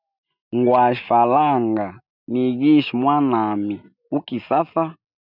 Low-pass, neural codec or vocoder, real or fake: 5.4 kHz; none; real